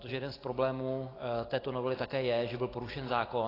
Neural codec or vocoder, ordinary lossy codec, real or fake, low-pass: none; AAC, 24 kbps; real; 5.4 kHz